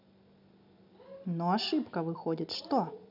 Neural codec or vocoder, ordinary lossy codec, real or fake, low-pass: none; none; real; 5.4 kHz